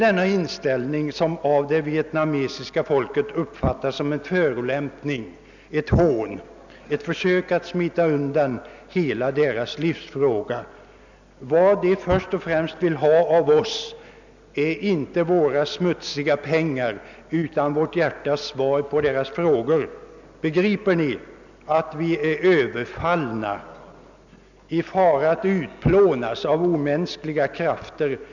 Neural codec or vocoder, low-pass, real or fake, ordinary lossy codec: none; 7.2 kHz; real; none